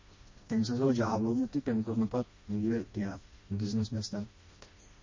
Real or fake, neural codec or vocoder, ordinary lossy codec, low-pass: fake; codec, 16 kHz, 1 kbps, FreqCodec, smaller model; MP3, 32 kbps; 7.2 kHz